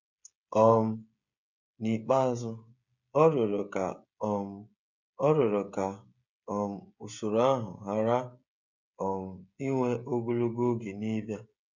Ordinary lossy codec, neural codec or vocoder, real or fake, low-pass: none; codec, 16 kHz, 16 kbps, FreqCodec, smaller model; fake; 7.2 kHz